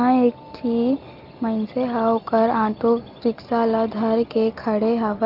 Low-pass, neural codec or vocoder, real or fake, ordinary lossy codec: 5.4 kHz; none; real; Opus, 32 kbps